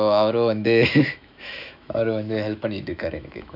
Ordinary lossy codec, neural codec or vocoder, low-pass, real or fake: none; autoencoder, 48 kHz, 128 numbers a frame, DAC-VAE, trained on Japanese speech; 5.4 kHz; fake